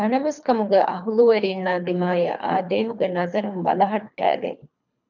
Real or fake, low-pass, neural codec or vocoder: fake; 7.2 kHz; codec, 24 kHz, 3 kbps, HILCodec